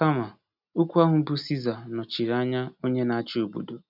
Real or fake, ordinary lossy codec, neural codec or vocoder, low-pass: real; none; none; 5.4 kHz